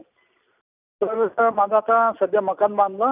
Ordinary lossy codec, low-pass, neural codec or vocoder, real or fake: none; 3.6 kHz; none; real